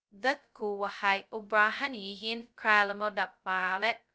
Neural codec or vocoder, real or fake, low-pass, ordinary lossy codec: codec, 16 kHz, 0.2 kbps, FocalCodec; fake; none; none